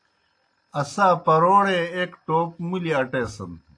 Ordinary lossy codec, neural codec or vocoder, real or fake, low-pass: AAC, 48 kbps; none; real; 9.9 kHz